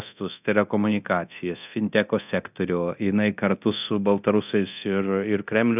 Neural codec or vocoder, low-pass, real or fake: codec, 24 kHz, 0.9 kbps, DualCodec; 3.6 kHz; fake